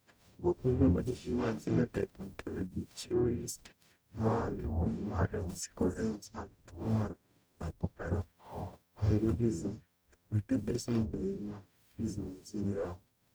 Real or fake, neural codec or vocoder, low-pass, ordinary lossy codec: fake; codec, 44.1 kHz, 0.9 kbps, DAC; none; none